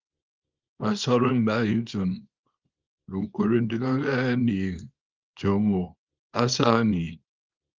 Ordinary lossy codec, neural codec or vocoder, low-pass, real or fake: Opus, 32 kbps; codec, 24 kHz, 0.9 kbps, WavTokenizer, small release; 7.2 kHz; fake